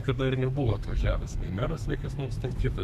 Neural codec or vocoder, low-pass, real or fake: codec, 32 kHz, 1.9 kbps, SNAC; 14.4 kHz; fake